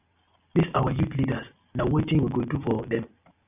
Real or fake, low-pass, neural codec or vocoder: real; 3.6 kHz; none